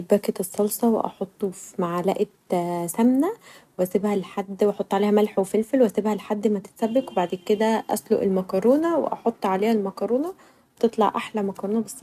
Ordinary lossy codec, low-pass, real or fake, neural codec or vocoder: none; 14.4 kHz; real; none